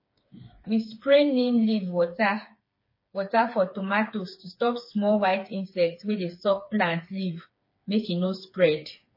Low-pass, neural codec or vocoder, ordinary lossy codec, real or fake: 5.4 kHz; codec, 16 kHz, 4 kbps, FreqCodec, smaller model; MP3, 24 kbps; fake